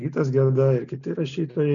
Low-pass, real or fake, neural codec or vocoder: 7.2 kHz; real; none